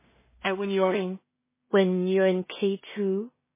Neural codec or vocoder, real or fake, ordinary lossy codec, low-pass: codec, 16 kHz in and 24 kHz out, 0.4 kbps, LongCat-Audio-Codec, two codebook decoder; fake; MP3, 16 kbps; 3.6 kHz